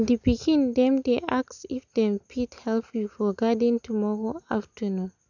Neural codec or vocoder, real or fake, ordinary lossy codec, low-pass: none; real; none; 7.2 kHz